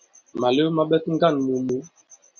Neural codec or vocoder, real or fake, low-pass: none; real; 7.2 kHz